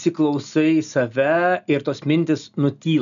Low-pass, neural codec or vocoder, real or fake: 7.2 kHz; none; real